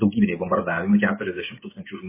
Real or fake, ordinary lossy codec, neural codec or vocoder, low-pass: fake; MP3, 16 kbps; codec, 16 kHz, 8 kbps, FreqCodec, smaller model; 3.6 kHz